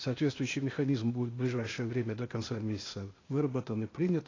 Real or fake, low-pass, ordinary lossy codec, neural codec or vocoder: fake; 7.2 kHz; AAC, 32 kbps; codec, 16 kHz, 0.8 kbps, ZipCodec